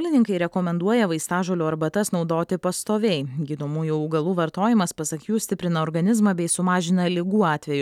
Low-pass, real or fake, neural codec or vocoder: 19.8 kHz; real; none